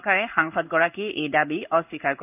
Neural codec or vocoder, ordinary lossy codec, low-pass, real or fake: codec, 16 kHz in and 24 kHz out, 1 kbps, XY-Tokenizer; none; 3.6 kHz; fake